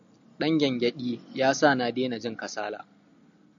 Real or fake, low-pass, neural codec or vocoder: real; 7.2 kHz; none